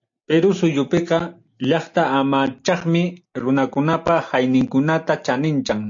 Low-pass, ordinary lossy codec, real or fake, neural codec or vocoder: 7.2 kHz; AAC, 64 kbps; real; none